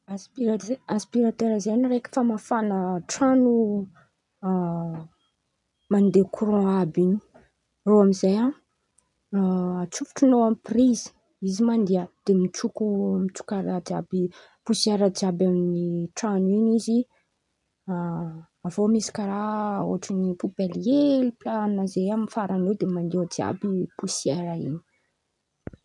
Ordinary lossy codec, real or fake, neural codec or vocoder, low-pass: none; real; none; 10.8 kHz